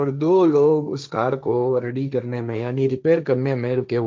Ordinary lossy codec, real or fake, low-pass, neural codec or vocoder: none; fake; none; codec, 16 kHz, 1.1 kbps, Voila-Tokenizer